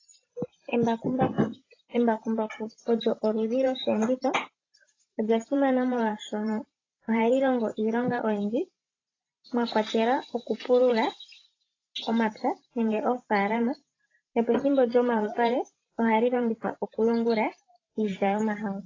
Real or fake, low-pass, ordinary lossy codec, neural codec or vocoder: fake; 7.2 kHz; AAC, 32 kbps; vocoder, 44.1 kHz, 128 mel bands every 512 samples, BigVGAN v2